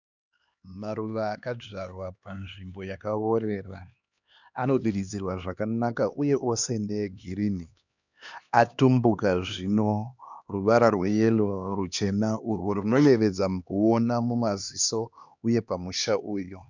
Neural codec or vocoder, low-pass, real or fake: codec, 16 kHz, 2 kbps, X-Codec, HuBERT features, trained on LibriSpeech; 7.2 kHz; fake